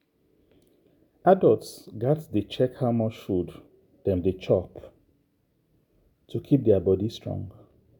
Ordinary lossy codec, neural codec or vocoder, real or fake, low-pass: none; none; real; 19.8 kHz